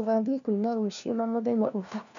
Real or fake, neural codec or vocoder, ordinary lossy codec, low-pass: fake; codec, 16 kHz, 1 kbps, FunCodec, trained on LibriTTS, 50 frames a second; none; 7.2 kHz